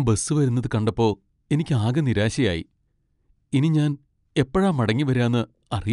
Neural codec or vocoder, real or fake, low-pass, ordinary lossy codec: none; real; 10.8 kHz; none